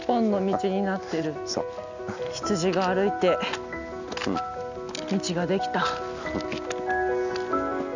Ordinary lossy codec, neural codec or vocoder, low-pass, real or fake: none; none; 7.2 kHz; real